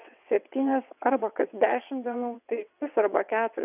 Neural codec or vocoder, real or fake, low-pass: vocoder, 22.05 kHz, 80 mel bands, WaveNeXt; fake; 3.6 kHz